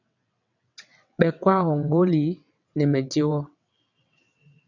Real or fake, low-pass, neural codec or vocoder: fake; 7.2 kHz; vocoder, 22.05 kHz, 80 mel bands, WaveNeXt